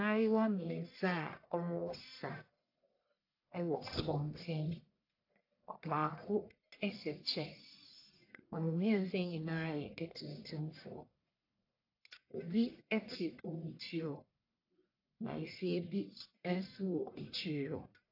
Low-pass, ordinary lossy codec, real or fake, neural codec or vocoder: 5.4 kHz; AAC, 32 kbps; fake; codec, 44.1 kHz, 1.7 kbps, Pupu-Codec